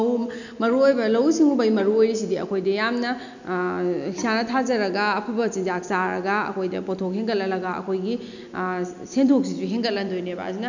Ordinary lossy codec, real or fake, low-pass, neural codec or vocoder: none; real; 7.2 kHz; none